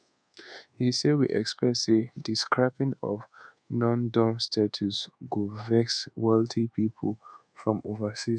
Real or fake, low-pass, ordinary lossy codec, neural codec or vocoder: fake; 9.9 kHz; none; codec, 24 kHz, 1.2 kbps, DualCodec